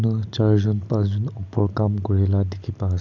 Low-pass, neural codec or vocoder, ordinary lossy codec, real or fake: 7.2 kHz; none; none; real